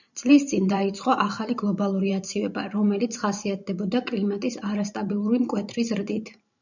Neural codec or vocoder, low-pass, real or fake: vocoder, 44.1 kHz, 128 mel bands every 512 samples, BigVGAN v2; 7.2 kHz; fake